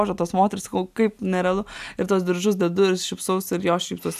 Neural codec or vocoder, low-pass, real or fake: none; 14.4 kHz; real